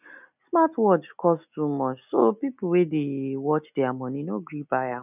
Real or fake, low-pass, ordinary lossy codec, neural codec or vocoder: real; 3.6 kHz; none; none